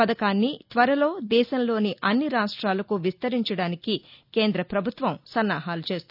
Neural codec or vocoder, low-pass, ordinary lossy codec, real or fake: none; 5.4 kHz; none; real